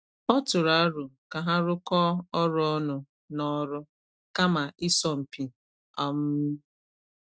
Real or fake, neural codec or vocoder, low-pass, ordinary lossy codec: real; none; none; none